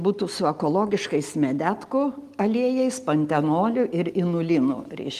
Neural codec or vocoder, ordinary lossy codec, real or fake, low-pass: none; Opus, 24 kbps; real; 14.4 kHz